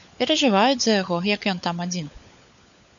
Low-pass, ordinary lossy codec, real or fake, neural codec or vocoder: 7.2 kHz; MP3, 96 kbps; fake; codec, 16 kHz, 16 kbps, FunCodec, trained on LibriTTS, 50 frames a second